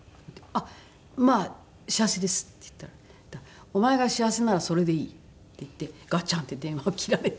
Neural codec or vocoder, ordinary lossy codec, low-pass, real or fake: none; none; none; real